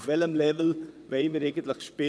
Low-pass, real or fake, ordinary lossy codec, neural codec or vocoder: none; fake; none; vocoder, 22.05 kHz, 80 mel bands, WaveNeXt